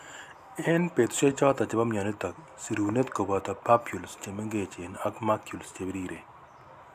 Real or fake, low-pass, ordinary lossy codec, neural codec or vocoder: real; 14.4 kHz; none; none